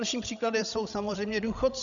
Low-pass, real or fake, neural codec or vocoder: 7.2 kHz; fake; codec, 16 kHz, 16 kbps, FreqCodec, larger model